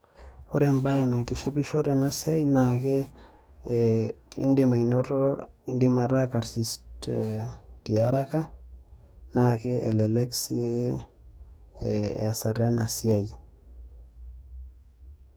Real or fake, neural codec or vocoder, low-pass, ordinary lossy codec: fake; codec, 44.1 kHz, 2.6 kbps, DAC; none; none